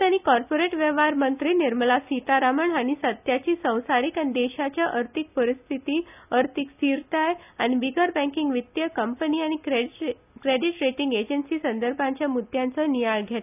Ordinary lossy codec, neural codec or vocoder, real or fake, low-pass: none; none; real; 3.6 kHz